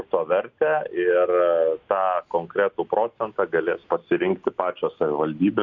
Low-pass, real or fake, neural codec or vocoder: 7.2 kHz; real; none